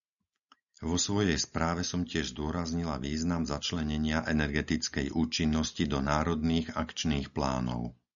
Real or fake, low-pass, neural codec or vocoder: real; 7.2 kHz; none